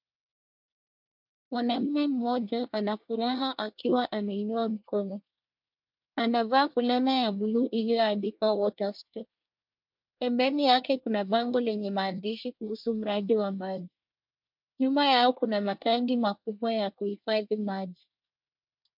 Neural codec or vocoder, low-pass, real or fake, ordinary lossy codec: codec, 24 kHz, 1 kbps, SNAC; 5.4 kHz; fake; MP3, 48 kbps